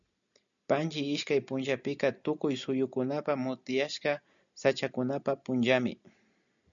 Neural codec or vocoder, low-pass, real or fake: none; 7.2 kHz; real